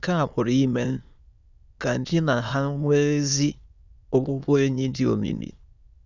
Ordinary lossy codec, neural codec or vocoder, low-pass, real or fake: Opus, 64 kbps; autoencoder, 22.05 kHz, a latent of 192 numbers a frame, VITS, trained on many speakers; 7.2 kHz; fake